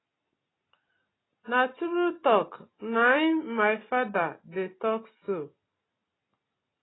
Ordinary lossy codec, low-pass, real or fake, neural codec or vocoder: AAC, 16 kbps; 7.2 kHz; real; none